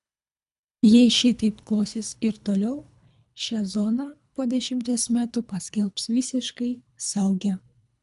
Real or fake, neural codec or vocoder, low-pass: fake; codec, 24 kHz, 3 kbps, HILCodec; 10.8 kHz